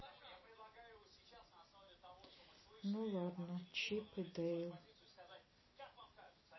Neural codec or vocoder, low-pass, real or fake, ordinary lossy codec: none; 7.2 kHz; real; MP3, 24 kbps